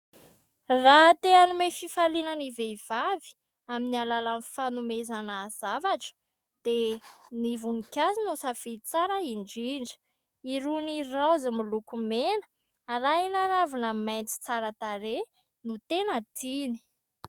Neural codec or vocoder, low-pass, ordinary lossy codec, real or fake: codec, 44.1 kHz, 7.8 kbps, DAC; 19.8 kHz; Opus, 64 kbps; fake